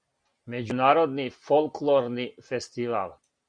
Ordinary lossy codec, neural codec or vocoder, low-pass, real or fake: Opus, 64 kbps; none; 9.9 kHz; real